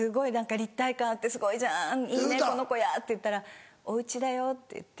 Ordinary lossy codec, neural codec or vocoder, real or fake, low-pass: none; none; real; none